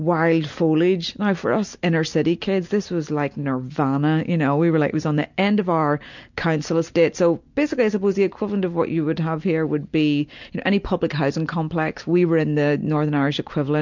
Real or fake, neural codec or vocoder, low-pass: real; none; 7.2 kHz